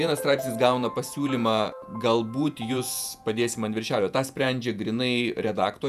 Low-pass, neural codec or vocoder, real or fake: 14.4 kHz; vocoder, 48 kHz, 128 mel bands, Vocos; fake